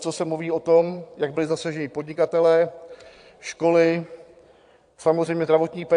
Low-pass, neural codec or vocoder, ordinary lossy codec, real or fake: 9.9 kHz; vocoder, 22.05 kHz, 80 mel bands, Vocos; AAC, 64 kbps; fake